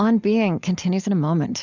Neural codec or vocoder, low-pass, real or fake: none; 7.2 kHz; real